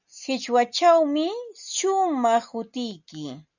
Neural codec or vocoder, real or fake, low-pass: none; real; 7.2 kHz